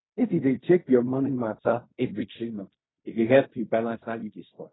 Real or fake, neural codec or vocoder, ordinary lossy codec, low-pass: fake; codec, 16 kHz in and 24 kHz out, 0.4 kbps, LongCat-Audio-Codec, fine tuned four codebook decoder; AAC, 16 kbps; 7.2 kHz